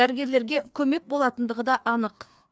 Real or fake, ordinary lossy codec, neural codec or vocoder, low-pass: fake; none; codec, 16 kHz, 2 kbps, FreqCodec, larger model; none